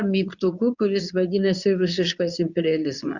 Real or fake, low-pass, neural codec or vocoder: fake; 7.2 kHz; codec, 24 kHz, 0.9 kbps, WavTokenizer, medium speech release version 2